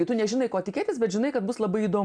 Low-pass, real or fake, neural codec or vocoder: 9.9 kHz; real; none